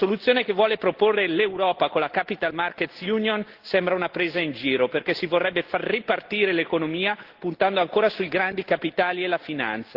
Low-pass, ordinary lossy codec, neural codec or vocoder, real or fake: 5.4 kHz; Opus, 16 kbps; none; real